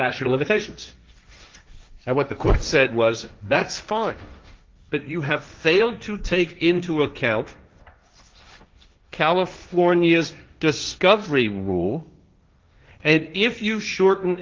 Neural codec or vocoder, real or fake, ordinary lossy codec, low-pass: codec, 16 kHz, 1.1 kbps, Voila-Tokenizer; fake; Opus, 32 kbps; 7.2 kHz